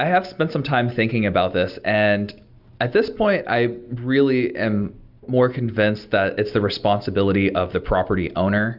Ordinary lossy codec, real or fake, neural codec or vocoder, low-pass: Opus, 64 kbps; real; none; 5.4 kHz